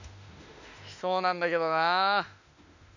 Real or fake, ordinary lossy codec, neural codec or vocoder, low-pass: fake; none; autoencoder, 48 kHz, 32 numbers a frame, DAC-VAE, trained on Japanese speech; 7.2 kHz